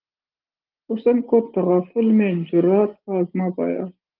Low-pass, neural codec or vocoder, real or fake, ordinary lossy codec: 5.4 kHz; none; real; Opus, 32 kbps